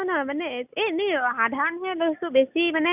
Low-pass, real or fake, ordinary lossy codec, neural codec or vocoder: 3.6 kHz; real; none; none